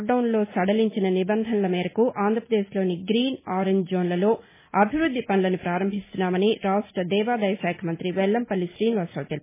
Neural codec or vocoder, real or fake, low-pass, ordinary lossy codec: none; real; 3.6 kHz; MP3, 16 kbps